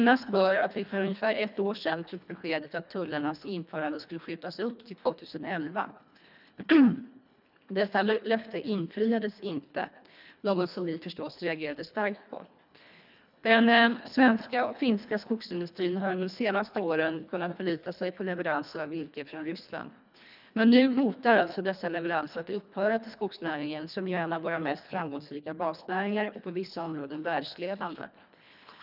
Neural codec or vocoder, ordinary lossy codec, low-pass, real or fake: codec, 24 kHz, 1.5 kbps, HILCodec; none; 5.4 kHz; fake